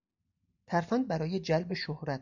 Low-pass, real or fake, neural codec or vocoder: 7.2 kHz; real; none